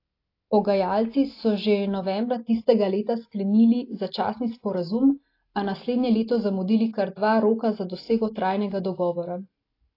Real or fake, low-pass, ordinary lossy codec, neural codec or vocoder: real; 5.4 kHz; AAC, 32 kbps; none